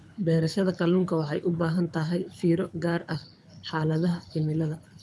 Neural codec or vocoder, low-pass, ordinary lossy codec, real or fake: codec, 24 kHz, 6 kbps, HILCodec; none; none; fake